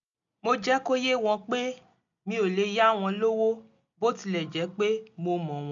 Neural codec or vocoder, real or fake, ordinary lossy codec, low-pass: none; real; none; 7.2 kHz